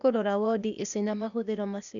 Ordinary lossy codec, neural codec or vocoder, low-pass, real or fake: none; codec, 16 kHz, 0.8 kbps, ZipCodec; 7.2 kHz; fake